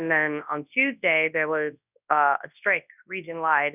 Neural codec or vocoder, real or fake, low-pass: codec, 24 kHz, 0.9 kbps, WavTokenizer, large speech release; fake; 3.6 kHz